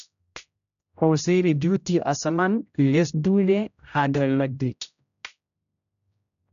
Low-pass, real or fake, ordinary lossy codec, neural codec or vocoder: 7.2 kHz; fake; none; codec, 16 kHz, 0.5 kbps, X-Codec, HuBERT features, trained on general audio